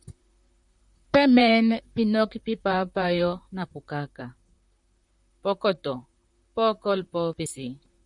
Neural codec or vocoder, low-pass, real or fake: vocoder, 44.1 kHz, 128 mel bands, Pupu-Vocoder; 10.8 kHz; fake